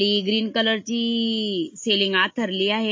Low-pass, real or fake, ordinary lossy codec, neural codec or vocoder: 7.2 kHz; real; MP3, 32 kbps; none